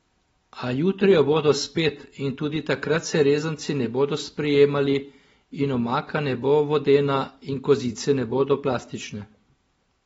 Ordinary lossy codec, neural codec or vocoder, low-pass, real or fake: AAC, 24 kbps; none; 9.9 kHz; real